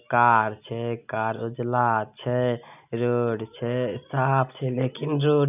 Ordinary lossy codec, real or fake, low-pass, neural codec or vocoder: none; real; 3.6 kHz; none